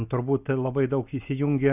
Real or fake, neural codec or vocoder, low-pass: real; none; 3.6 kHz